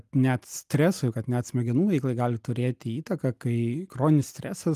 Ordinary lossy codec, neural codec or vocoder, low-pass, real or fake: Opus, 32 kbps; none; 14.4 kHz; real